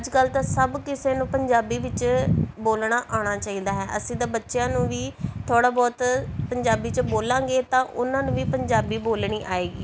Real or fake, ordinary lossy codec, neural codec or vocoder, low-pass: real; none; none; none